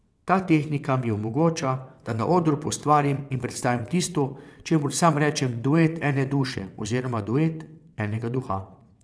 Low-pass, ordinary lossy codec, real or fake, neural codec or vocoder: none; none; fake; vocoder, 22.05 kHz, 80 mel bands, WaveNeXt